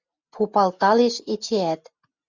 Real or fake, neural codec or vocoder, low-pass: real; none; 7.2 kHz